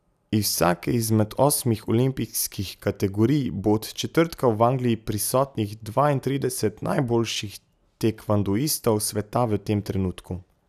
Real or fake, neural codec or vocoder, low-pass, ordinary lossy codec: real; none; 14.4 kHz; none